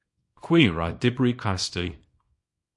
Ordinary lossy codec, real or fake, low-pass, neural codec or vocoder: MP3, 48 kbps; fake; 10.8 kHz; codec, 24 kHz, 0.9 kbps, WavTokenizer, small release